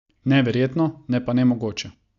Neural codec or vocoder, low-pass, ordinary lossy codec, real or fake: none; 7.2 kHz; none; real